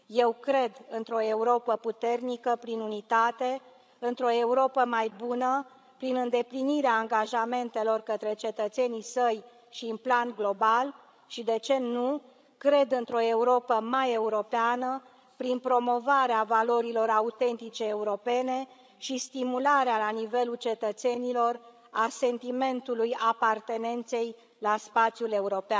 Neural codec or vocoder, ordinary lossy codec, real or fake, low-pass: codec, 16 kHz, 16 kbps, FreqCodec, larger model; none; fake; none